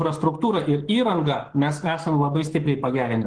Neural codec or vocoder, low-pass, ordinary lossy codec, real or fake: codec, 44.1 kHz, 7.8 kbps, Pupu-Codec; 9.9 kHz; Opus, 16 kbps; fake